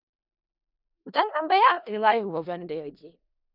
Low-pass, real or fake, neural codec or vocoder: 5.4 kHz; fake; codec, 16 kHz in and 24 kHz out, 0.4 kbps, LongCat-Audio-Codec, four codebook decoder